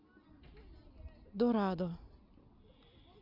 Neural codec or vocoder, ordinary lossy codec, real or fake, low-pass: none; none; real; 5.4 kHz